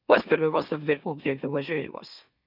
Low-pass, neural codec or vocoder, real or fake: 5.4 kHz; autoencoder, 44.1 kHz, a latent of 192 numbers a frame, MeloTTS; fake